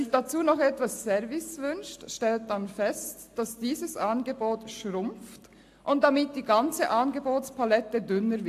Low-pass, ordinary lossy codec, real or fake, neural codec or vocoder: 14.4 kHz; AAC, 64 kbps; real; none